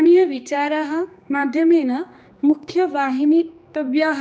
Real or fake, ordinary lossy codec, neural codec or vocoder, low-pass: fake; none; codec, 16 kHz, 2 kbps, X-Codec, HuBERT features, trained on general audio; none